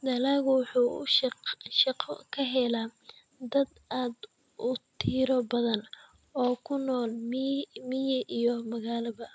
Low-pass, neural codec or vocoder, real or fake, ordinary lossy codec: none; none; real; none